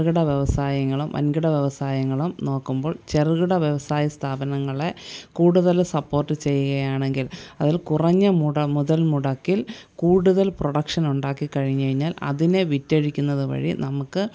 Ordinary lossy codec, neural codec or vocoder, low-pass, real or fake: none; none; none; real